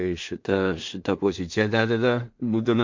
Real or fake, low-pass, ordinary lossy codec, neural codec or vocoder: fake; 7.2 kHz; MP3, 48 kbps; codec, 16 kHz in and 24 kHz out, 0.4 kbps, LongCat-Audio-Codec, two codebook decoder